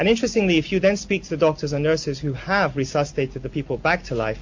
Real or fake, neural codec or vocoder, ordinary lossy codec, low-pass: real; none; MP3, 48 kbps; 7.2 kHz